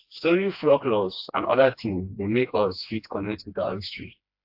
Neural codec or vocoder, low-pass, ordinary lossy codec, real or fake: codec, 16 kHz, 2 kbps, FreqCodec, smaller model; 5.4 kHz; AAC, 48 kbps; fake